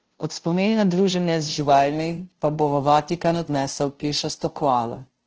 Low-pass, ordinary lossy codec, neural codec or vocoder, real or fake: 7.2 kHz; Opus, 16 kbps; codec, 16 kHz, 0.5 kbps, FunCodec, trained on Chinese and English, 25 frames a second; fake